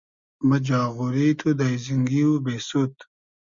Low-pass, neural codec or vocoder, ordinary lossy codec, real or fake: 7.2 kHz; none; Opus, 64 kbps; real